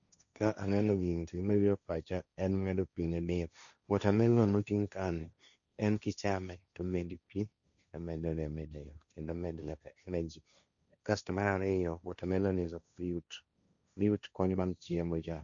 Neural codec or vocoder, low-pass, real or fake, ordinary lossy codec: codec, 16 kHz, 1.1 kbps, Voila-Tokenizer; 7.2 kHz; fake; none